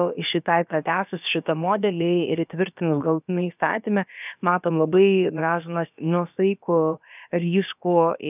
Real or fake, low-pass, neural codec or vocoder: fake; 3.6 kHz; codec, 16 kHz, about 1 kbps, DyCAST, with the encoder's durations